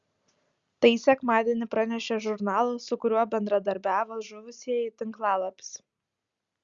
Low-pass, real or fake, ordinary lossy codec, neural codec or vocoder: 7.2 kHz; real; Opus, 64 kbps; none